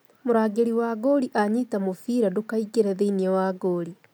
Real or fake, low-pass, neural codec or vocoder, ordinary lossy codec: real; none; none; none